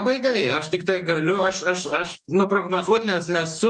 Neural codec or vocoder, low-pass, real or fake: codec, 44.1 kHz, 2.6 kbps, DAC; 10.8 kHz; fake